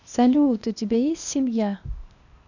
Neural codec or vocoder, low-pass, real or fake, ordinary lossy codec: codec, 16 kHz, 0.8 kbps, ZipCodec; 7.2 kHz; fake; none